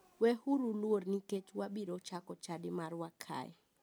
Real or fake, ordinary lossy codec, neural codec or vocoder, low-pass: real; none; none; none